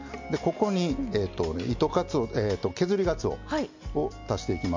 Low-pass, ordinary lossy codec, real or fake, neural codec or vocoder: 7.2 kHz; MP3, 64 kbps; real; none